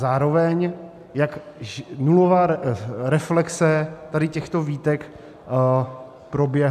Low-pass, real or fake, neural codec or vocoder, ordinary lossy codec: 14.4 kHz; real; none; AAC, 96 kbps